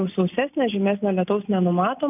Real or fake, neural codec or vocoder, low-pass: real; none; 3.6 kHz